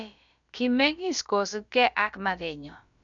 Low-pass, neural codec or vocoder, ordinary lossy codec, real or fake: 7.2 kHz; codec, 16 kHz, about 1 kbps, DyCAST, with the encoder's durations; none; fake